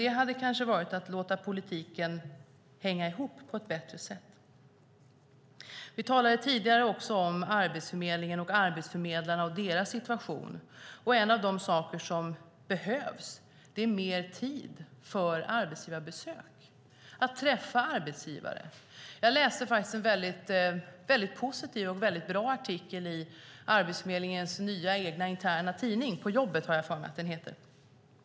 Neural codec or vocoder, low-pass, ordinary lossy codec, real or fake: none; none; none; real